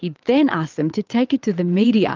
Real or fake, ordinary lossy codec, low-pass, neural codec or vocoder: fake; Opus, 32 kbps; 7.2 kHz; vocoder, 22.05 kHz, 80 mel bands, WaveNeXt